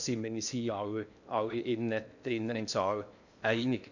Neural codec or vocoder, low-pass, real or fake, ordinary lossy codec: codec, 16 kHz in and 24 kHz out, 0.8 kbps, FocalCodec, streaming, 65536 codes; 7.2 kHz; fake; none